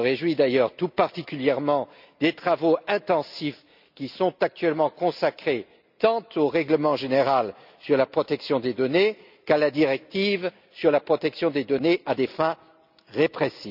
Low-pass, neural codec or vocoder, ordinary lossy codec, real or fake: 5.4 kHz; none; none; real